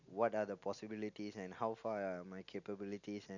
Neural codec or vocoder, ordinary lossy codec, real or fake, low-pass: none; none; real; 7.2 kHz